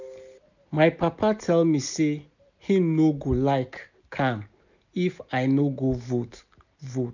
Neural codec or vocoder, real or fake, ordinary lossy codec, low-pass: none; real; none; 7.2 kHz